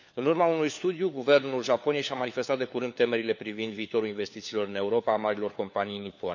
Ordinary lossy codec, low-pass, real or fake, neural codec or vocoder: none; 7.2 kHz; fake; codec, 16 kHz, 4 kbps, FunCodec, trained on LibriTTS, 50 frames a second